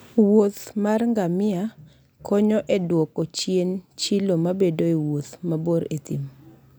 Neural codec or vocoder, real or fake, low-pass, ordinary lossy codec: none; real; none; none